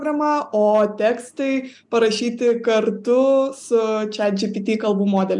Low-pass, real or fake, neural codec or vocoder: 10.8 kHz; real; none